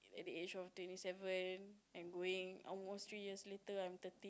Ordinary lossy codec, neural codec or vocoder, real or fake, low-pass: none; none; real; none